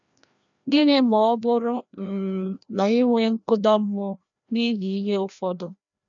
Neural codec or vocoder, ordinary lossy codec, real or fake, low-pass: codec, 16 kHz, 1 kbps, FreqCodec, larger model; none; fake; 7.2 kHz